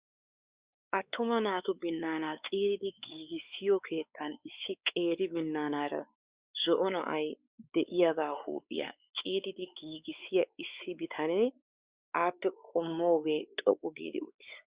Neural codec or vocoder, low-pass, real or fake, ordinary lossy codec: codec, 16 kHz, 4 kbps, X-Codec, WavLM features, trained on Multilingual LibriSpeech; 3.6 kHz; fake; Opus, 64 kbps